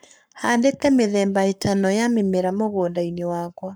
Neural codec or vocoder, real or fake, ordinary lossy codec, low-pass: codec, 44.1 kHz, 7.8 kbps, Pupu-Codec; fake; none; none